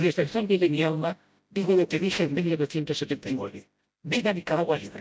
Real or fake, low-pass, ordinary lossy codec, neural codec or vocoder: fake; none; none; codec, 16 kHz, 0.5 kbps, FreqCodec, smaller model